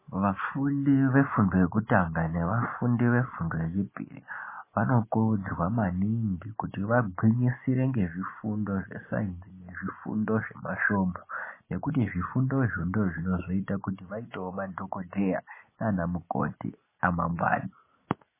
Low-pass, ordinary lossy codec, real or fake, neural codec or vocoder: 3.6 kHz; MP3, 16 kbps; real; none